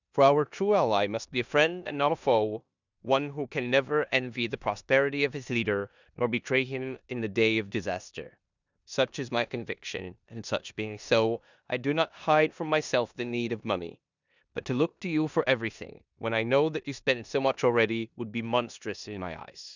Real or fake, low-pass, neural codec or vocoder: fake; 7.2 kHz; codec, 16 kHz in and 24 kHz out, 0.9 kbps, LongCat-Audio-Codec, four codebook decoder